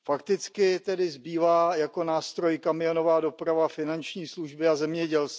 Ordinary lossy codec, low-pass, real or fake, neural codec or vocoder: none; none; real; none